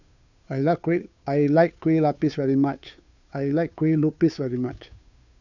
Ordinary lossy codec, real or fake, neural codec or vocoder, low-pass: none; fake; codec, 16 kHz, 2 kbps, FunCodec, trained on Chinese and English, 25 frames a second; 7.2 kHz